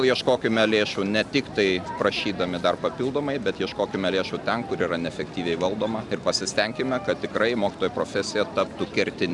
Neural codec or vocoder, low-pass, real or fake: none; 10.8 kHz; real